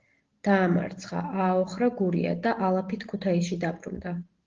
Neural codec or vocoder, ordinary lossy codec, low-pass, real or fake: none; Opus, 16 kbps; 7.2 kHz; real